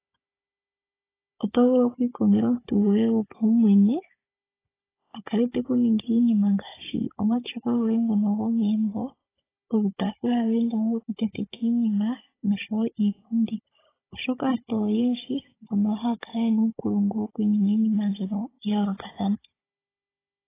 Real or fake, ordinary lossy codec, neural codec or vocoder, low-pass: fake; AAC, 16 kbps; codec, 16 kHz, 16 kbps, FunCodec, trained on Chinese and English, 50 frames a second; 3.6 kHz